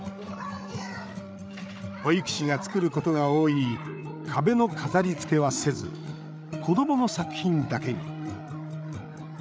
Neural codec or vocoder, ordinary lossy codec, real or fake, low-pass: codec, 16 kHz, 8 kbps, FreqCodec, larger model; none; fake; none